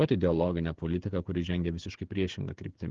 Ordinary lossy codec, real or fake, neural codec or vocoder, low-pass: Opus, 16 kbps; fake; codec, 16 kHz, 16 kbps, FreqCodec, smaller model; 7.2 kHz